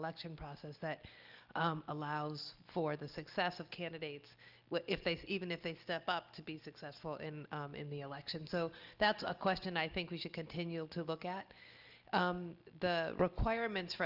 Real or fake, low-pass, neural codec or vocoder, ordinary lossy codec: real; 5.4 kHz; none; Opus, 24 kbps